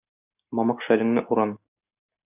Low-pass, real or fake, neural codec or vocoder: 3.6 kHz; real; none